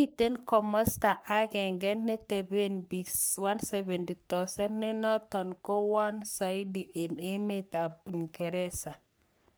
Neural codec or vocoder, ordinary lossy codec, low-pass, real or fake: codec, 44.1 kHz, 3.4 kbps, Pupu-Codec; none; none; fake